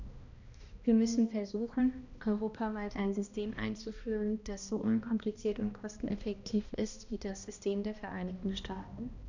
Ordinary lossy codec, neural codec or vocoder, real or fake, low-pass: none; codec, 16 kHz, 1 kbps, X-Codec, HuBERT features, trained on balanced general audio; fake; 7.2 kHz